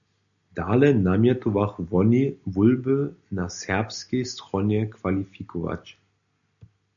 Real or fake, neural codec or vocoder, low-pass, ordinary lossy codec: real; none; 7.2 kHz; MP3, 96 kbps